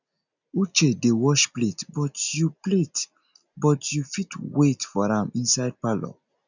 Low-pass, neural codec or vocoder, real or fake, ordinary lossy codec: 7.2 kHz; none; real; none